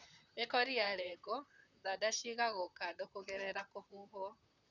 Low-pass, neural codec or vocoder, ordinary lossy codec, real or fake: 7.2 kHz; vocoder, 44.1 kHz, 128 mel bands, Pupu-Vocoder; none; fake